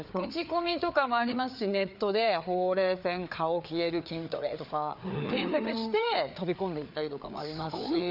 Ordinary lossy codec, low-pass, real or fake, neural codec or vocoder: none; 5.4 kHz; fake; codec, 16 kHz, 4 kbps, FreqCodec, larger model